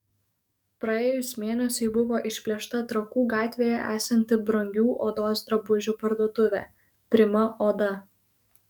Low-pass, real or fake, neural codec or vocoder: 19.8 kHz; fake; codec, 44.1 kHz, 7.8 kbps, DAC